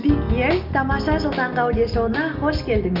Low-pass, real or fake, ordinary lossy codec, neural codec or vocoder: 5.4 kHz; real; Opus, 24 kbps; none